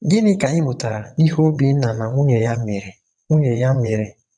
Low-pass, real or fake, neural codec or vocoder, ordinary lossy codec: 9.9 kHz; fake; vocoder, 22.05 kHz, 80 mel bands, WaveNeXt; none